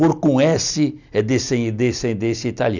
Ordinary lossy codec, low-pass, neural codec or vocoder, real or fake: none; 7.2 kHz; none; real